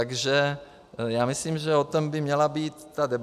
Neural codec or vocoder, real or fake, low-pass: none; real; 14.4 kHz